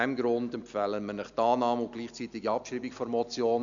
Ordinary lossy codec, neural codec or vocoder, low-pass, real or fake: none; none; 7.2 kHz; real